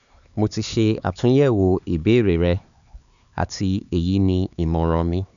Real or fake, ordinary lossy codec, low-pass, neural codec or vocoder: fake; none; 7.2 kHz; codec, 16 kHz, 4 kbps, X-Codec, HuBERT features, trained on LibriSpeech